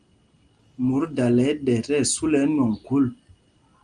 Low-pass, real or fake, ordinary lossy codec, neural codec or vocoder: 9.9 kHz; real; Opus, 32 kbps; none